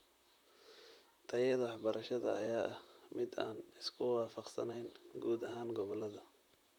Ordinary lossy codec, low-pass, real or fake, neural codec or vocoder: none; 19.8 kHz; fake; vocoder, 44.1 kHz, 128 mel bands, Pupu-Vocoder